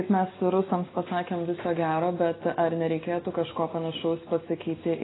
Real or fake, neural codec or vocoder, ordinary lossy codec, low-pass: real; none; AAC, 16 kbps; 7.2 kHz